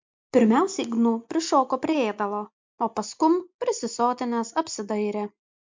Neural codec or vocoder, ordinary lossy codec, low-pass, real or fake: none; MP3, 64 kbps; 7.2 kHz; real